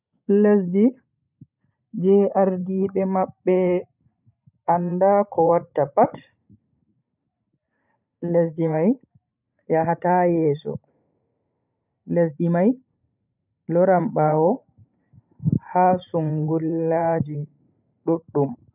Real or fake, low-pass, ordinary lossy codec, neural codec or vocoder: fake; 3.6 kHz; none; vocoder, 44.1 kHz, 128 mel bands every 512 samples, BigVGAN v2